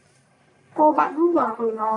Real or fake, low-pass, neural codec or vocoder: fake; 10.8 kHz; codec, 44.1 kHz, 1.7 kbps, Pupu-Codec